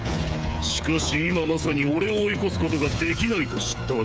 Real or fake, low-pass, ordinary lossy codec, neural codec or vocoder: fake; none; none; codec, 16 kHz, 8 kbps, FreqCodec, smaller model